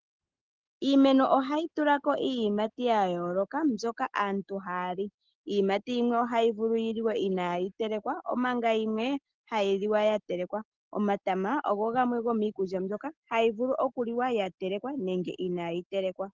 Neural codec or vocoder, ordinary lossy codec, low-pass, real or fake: none; Opus, 16 kbps; 7.2 kHz; real